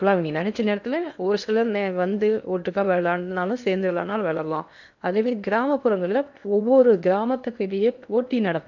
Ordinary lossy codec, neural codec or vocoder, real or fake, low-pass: none; codec, 16 kHz in and 24 kHz out, 0.8 kbps, FocalCodec, streaming, 65536 codes; fake; 7.2 kHz